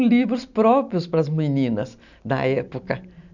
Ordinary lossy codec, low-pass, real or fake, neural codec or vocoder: none; 7.2 kHz; real; none